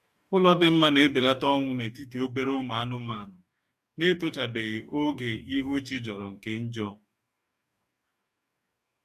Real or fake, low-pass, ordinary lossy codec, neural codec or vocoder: fake; 14.4 kHz; AAC, 96 kbps; codec, 44.1 kHz, 2.6 kbps, DAC